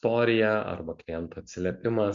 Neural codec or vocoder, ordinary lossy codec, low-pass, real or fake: none; AAC, 64 kbps; 7.2 kHz; real